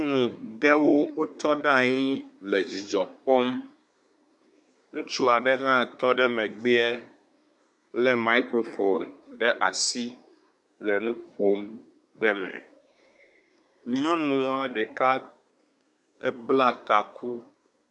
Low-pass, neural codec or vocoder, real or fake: 10.8 kHz; codec, 24 kHz, 1 kbps, SNAC; fake